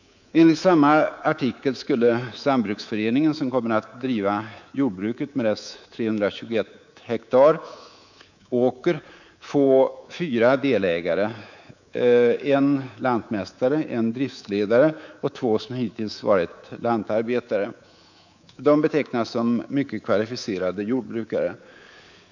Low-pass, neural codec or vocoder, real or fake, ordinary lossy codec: 7.2 kHz; codec, 24 kHz, 3.1 kbps, DualCodec; fake; none